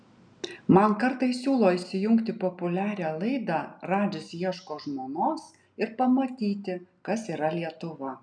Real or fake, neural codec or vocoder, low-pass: real; none; 9.9 kHz